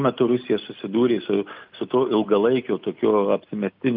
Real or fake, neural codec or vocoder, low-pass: real; none; 5.4 kHz